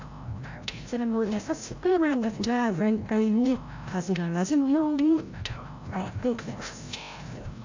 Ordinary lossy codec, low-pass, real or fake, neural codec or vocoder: AAC, 48 kbps; 7.2 kHz; fake; codec, 16 kHz, 0.5 kbps, FreqCodec, larger model